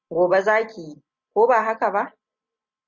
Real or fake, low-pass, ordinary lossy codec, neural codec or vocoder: real; 7.2 kHz; Opus, 64 kbps; none